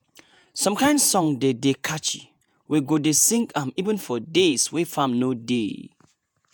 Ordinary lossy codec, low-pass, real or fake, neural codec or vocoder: none; none; fake; vocoder, 48 kHz, 128 mel bands, Vocos